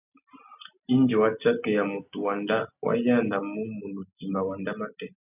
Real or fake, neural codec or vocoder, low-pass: real; none; 3.6 kHz